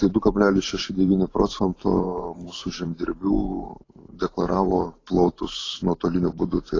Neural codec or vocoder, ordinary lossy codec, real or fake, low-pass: vocoder, 44.1 kHz, 128 mel bands every 512 samples, BigVGAN v2; AAC, 32 kbps; fake; 7.2 kHz